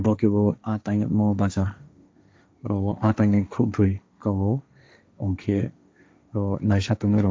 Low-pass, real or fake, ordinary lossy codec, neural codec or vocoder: none; fake; none; codec, 16 kHz, 1.1 kbps, Voila-Tokenizer